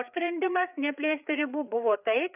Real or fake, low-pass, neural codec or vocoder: fake; 3.6 kHz; codec, 16 kHz, 4 kbps, FreqCodec, larger model